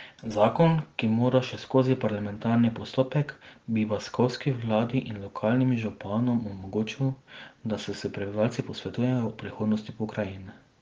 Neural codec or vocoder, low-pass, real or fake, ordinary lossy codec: none; 7.2 kHz; real; Opus, 16 kbps